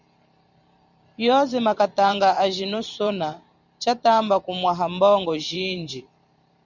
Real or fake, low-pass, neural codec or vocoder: fake; 7.2 kHz; vocoder, 44.1 kHz, 128 mel bands every 512 samples, BigVGAN v2